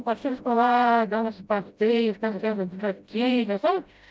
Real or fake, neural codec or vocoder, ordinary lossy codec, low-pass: fake; codec, 16 kHz, 0.5 kbps, FreqCodec, smaller model; none; none